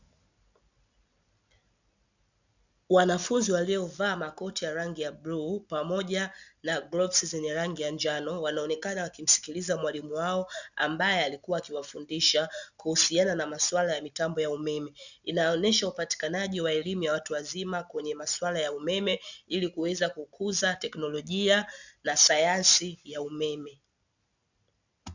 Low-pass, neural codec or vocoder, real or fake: 7.2 kHz; none; real